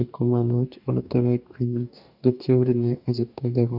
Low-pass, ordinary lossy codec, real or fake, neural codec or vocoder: 5.4 kHz; none; fake; codec, 44.1 kHz, 2.6 kbps, DAC